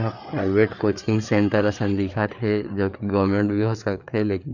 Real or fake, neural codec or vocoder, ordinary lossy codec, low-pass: fake; codec, 16 kHz, 4 kbps, FreqCodec, larger model; none; 7.2 kHz